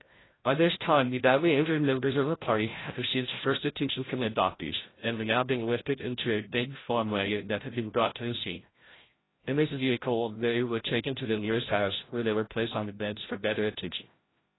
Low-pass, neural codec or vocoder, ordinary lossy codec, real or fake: 7.2 kHz; codec, 16 kHz, 0.5 kbps, FreqCodec, larger model; AAC, 16 kbps; fake